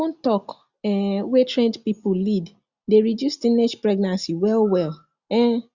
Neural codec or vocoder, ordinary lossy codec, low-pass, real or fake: none; Opus, 64 kbps; 7.2 kHz; real